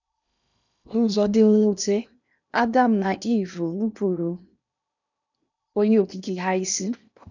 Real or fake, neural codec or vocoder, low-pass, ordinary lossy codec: fake; codec, 16 kHz in and 24 kHz out, 0.8 kbps, FocalCodec, streaming, 65536 codes; 7.2 kHz; none